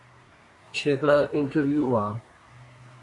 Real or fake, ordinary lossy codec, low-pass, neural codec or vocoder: fake; AAC, 48 kbps; 10.8 kHz; codec, 24 kHz, 1 kbps, SNAC